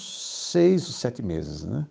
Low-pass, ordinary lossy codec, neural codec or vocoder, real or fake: none; none; codec, 16 kHz, 8 kbps, FunCodec, trained on Chinese and English, 25 frames a second; fake